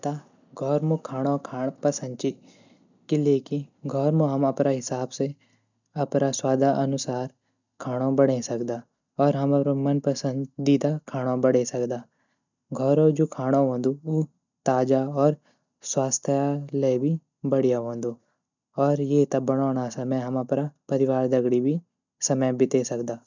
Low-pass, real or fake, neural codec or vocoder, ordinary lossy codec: 7.2 kHz; real; none; none